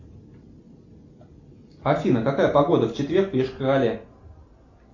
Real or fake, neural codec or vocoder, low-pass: real; none; 7.2 kHz